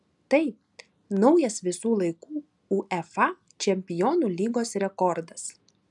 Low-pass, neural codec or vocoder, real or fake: 10.8 kHz; none; real